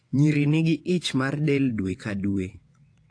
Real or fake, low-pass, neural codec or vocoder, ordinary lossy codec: fake; 9.9 kHz; vocoder, 22.05 kHz, 80 mel bands, WaveNeXt; AAC, 48 kbps